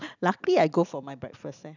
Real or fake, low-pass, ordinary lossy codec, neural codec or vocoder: real; 7.2 kHz; none; none